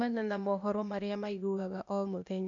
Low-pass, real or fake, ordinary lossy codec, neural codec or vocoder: 7.2 kHz; fake; none; codec, 16 kHz, 0.8 kbps, ZipCodec